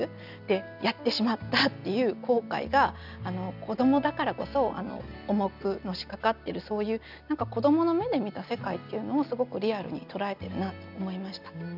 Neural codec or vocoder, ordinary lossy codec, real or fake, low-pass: none; none; real; 5.4 kHz